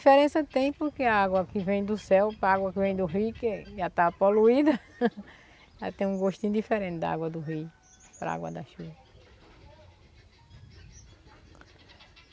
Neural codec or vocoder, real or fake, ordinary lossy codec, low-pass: none; real; none; none